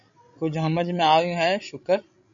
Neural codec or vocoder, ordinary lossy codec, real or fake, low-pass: codec, 16 kHz, 16 kbps, FreqCodec, larger model; AAC, 64 kbps; fake; 7.2 kHz